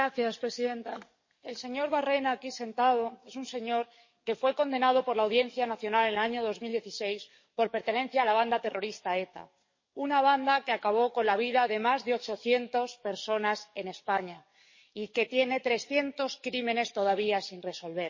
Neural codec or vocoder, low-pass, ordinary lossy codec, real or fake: codec, 44.1 kHz, 7.8 kbps, DAC; 7.2 kHz; MP3, 32 kbps; fake